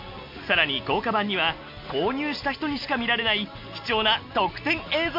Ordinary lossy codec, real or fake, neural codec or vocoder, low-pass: MP3, 48 kbps; real; none; 5.4 kHz